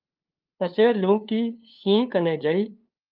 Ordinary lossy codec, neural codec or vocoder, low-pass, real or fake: Opus, 24 kbps; codec, 16 kHz, 2 kbps, FunCodec, trained on LibriTTS, 25 frames a second; 5.4 kHz; fake